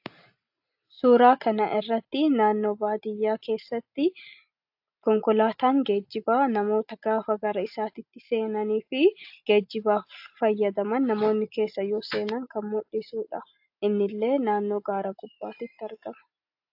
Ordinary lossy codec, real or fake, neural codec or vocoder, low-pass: AAC, 48 kbps; real; none; 5.4 kHz